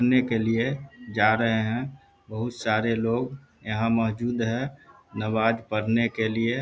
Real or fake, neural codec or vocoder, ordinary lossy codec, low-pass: real; none; none; none